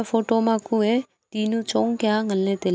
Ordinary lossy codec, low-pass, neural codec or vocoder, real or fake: none; none; none; real